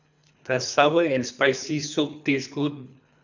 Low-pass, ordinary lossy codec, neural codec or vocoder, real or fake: 7.2 kHz; none; codec, 24 kHz, 3 kbps, HILCodec; fake